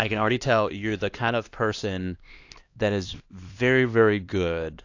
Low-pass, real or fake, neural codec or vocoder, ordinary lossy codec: 7.2 kHz; fake; codec, 16 kHz, 2 kbps, X-Codec, HuBERT features, trained on LibriSpeech; AAC, 48 kbps